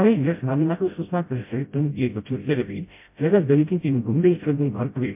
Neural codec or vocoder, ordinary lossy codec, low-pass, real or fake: codec, 16 kHz, 0.5 kbps, FreqCodec, smaller model; MP3, 32 kbps; 3.6 kHz; fake